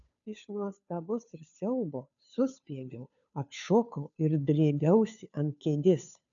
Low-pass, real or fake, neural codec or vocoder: 7.2 kHz; fake; codec, 16 kHz, 2 kbps, FunCodec, trained on Chinese and English, 25 frames a second